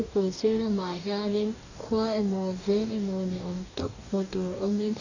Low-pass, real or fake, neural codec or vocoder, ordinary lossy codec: 7.2 kHz; fake; codec, 44.1 kHz, 2.6 kbps, DAC; none